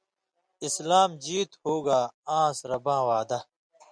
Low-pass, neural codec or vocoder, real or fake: 9.9 kHz; none; real